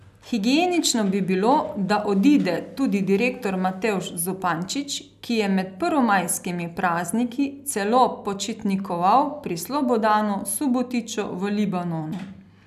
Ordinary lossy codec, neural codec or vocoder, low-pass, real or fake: none; none; 14.4 kHz; real